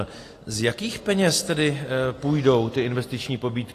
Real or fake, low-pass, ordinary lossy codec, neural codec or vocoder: real; 14.4 kHz; AAC, 48 kbps; none